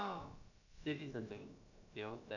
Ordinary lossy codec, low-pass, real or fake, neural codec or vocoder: none; 7.2 kHz; fake; codec, 16 kHz, about 1 kbps, DyCAST, with the encoder's durations